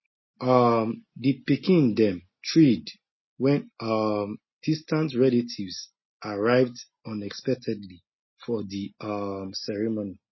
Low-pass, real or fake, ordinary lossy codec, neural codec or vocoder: 7.2 kHz; real; MP3, 24 kbps; none